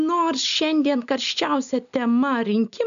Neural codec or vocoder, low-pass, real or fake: none; 7.2 kHz; real